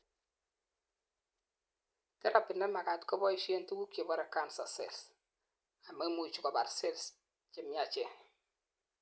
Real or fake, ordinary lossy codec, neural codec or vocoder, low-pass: real; none; none; none